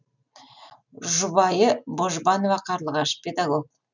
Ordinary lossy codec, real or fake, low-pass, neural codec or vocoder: none; real; 7.2 kHz; none